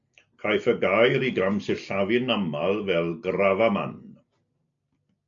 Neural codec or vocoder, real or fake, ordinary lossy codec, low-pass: none; real; MP3, 64 kbps; 7.2 kHz